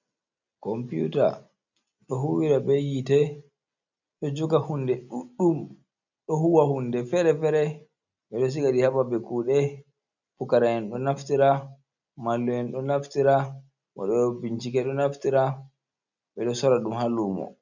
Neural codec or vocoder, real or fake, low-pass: none; real; 7.2 kHz